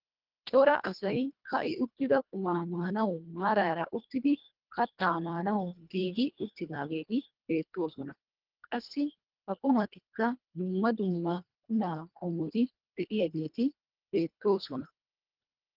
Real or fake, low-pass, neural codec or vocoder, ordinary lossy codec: fake; 5.4 kHz; codec, 24 kHz, 1.5 kbps, HILCodec; Opus, 32 kbps